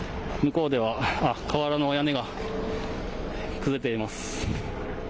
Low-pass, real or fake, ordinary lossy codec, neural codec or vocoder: none; real; none; none